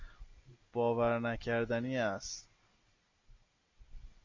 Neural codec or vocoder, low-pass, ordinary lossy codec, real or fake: none; 7.2 kHz; MP3, 64 kbps; real